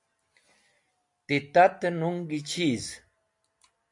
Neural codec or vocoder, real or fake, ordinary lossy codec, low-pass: none; real; MP3, 64 kbps; 10.8 kHz